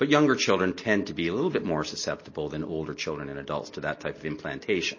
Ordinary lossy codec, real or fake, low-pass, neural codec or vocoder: MP3, 32 kbps; real; 7.2 kHz; none